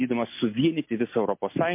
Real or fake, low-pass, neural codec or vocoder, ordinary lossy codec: real; 3.6 kHz; none; MP3, 24 kbps